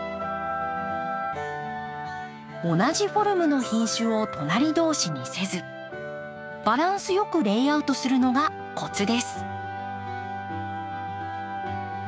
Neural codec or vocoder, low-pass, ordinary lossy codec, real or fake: codec, 16 kHz, 6 kbps, DAC; none; none; fake